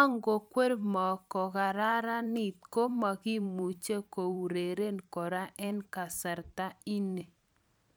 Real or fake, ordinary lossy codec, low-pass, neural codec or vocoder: fake; none; none; vocoder, 44.1 kHz, 128 mel bands every 512 samples, BigVGAN v2